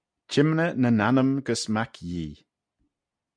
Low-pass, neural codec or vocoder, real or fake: 9.9 kHz; none; real